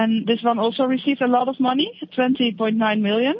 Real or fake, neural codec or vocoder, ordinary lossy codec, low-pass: real; none; MP3, 32 kbps; 7.2 kHz